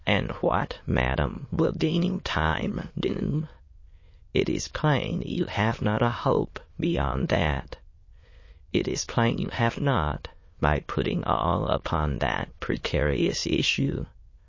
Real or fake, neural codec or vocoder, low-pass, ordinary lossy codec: fake; autoencoder, 22.05 kHz, a latent of 192 numbers a frame, VITS, trained on many speakers; 7.2 kHz; MP3, 32 kbps